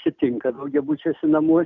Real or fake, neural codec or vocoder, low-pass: real; none; 7.2 kHz